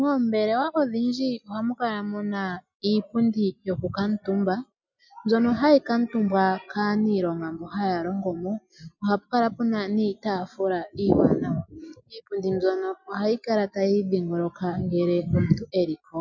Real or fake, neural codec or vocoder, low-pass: real; none; 7.2 kHz